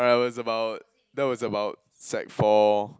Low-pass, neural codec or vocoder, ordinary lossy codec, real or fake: none; none; none; real